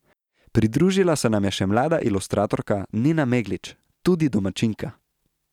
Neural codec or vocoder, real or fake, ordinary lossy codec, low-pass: none; real; none; 19.8 kHz